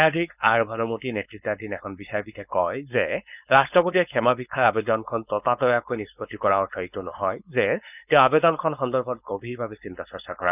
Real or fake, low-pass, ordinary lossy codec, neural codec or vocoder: fake; 3.6 kHz; none; codec, 16 kHz, 4.8 kbps, FACodec